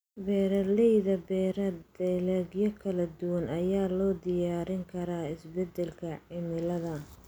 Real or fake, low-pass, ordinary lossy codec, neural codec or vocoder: real; none; none; none